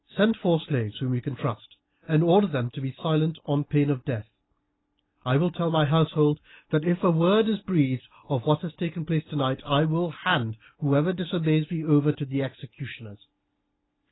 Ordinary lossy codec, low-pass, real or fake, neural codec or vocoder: AAC, 16 kbps; 7.2 kHz; real; none